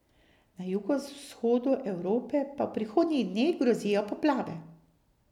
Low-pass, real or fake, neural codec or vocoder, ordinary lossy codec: 19.8 kHz; real; none; none